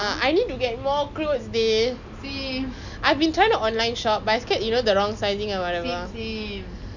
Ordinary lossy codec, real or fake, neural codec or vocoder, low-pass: none; real; none; 7.2 kHz